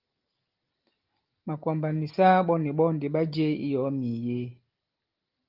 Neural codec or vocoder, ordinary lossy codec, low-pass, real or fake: none; Opus, 32 kbps; 5.4 kHz; real